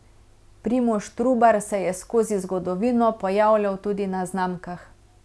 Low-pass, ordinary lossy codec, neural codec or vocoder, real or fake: none; none; none; real